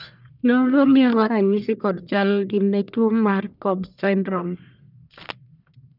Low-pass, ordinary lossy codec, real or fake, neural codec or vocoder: 5.4 kHz; none; fake; codec, 44.1 kHz, 1.7 kbps, Pupu-Codec